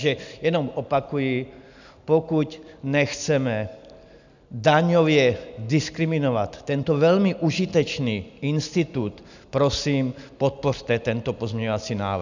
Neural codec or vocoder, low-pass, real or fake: none; 7.2 kHz; real